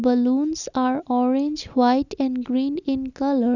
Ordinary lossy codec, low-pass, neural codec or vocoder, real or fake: none; 7.2 kHz; none; real